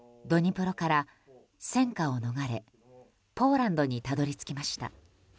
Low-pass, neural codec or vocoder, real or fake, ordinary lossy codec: none; none; real; none